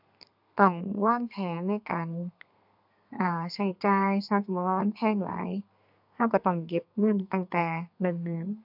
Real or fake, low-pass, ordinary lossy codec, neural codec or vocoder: fake; 5.4 kHz; AAC, 48 kbps; codec, 32 kHz, 1.9 kbps, SNAC